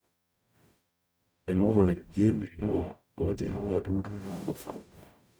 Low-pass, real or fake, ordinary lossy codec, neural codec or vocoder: none; fake; none; codec, 44.1 kHz, 0.9 kbps, DAC